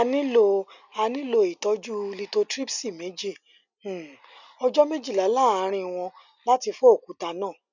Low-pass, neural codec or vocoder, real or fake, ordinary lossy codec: 7.2 kHz; none; real; none